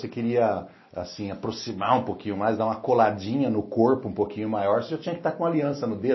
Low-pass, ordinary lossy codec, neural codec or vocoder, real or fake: 7.2 kHz; MP3, 24 kbps; none; real